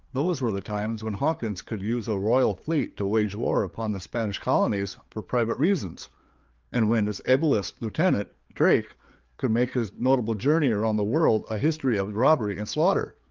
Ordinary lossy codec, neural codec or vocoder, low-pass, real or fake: Opus, 32 kbps; codec, 16 kHz, 4 kbps, FreqCodec, larger model; 7.2 kHz; fake